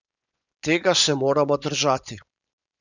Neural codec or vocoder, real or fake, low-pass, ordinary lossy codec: vocoder, 24 kHz, 100 mel bands, Vocos; fake; 7.2 kHz; none